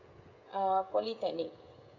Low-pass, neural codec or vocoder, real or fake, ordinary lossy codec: 7.2 kHz; codec, 16 kHz, 16 kbps, FreqCodec, smaller model; fake; none